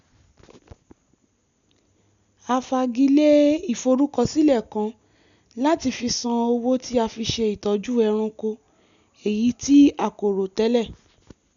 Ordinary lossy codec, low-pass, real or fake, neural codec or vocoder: none; 7.2 kHz; real; none